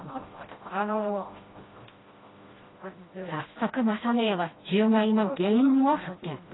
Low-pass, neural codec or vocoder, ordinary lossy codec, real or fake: 7.2 kHz; codec, 16 kHz, 1 kbps, FreqCodec, smaller model; AAC, 16 kbps; fake